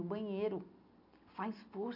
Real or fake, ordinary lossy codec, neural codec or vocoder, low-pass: real; none; none; 5.4 kHz